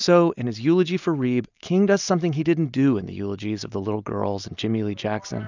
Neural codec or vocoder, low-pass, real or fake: none; 7.2 kHz; real